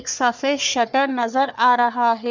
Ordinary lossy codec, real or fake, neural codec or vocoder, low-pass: none; fake; codec, 44.1 kHz, 3.4 kbps, Pupu-Codec; 7.2 kHz